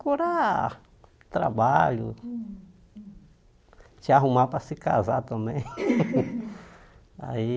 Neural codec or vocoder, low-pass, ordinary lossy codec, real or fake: none; none; none; real